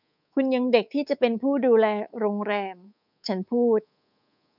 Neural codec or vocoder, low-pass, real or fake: codec, 24 kHz, 3.1 kbps, DualCodec; 5.4 kHz; fake